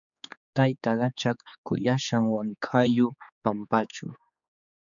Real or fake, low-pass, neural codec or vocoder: fake; 7.2 kHz; codec, 16 kHz, 4 kbps, X-Codec, HuBERT features, trained on general audio